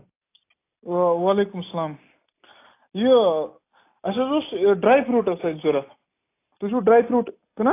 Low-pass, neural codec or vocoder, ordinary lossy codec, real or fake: 3.6 kHz; none; AAC, 24 kbps; real